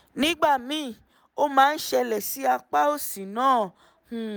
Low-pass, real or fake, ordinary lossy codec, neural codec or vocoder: none; real; none; none